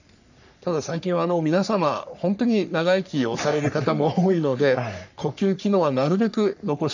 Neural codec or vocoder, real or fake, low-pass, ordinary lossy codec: codec, 44.1 kHz, 3.4 kbps, Pupu-Codec; fake; 7.2 kHz; none